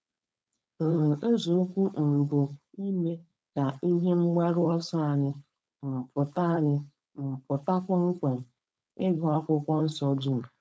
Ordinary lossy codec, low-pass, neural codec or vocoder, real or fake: none; none; codec, 16 kHz, 4.8 kbps, FACodec; fake